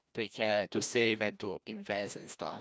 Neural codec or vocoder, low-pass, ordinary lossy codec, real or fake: codec, 16 kHz, 1 kbps, FreqCodec, larger model; none; none; fake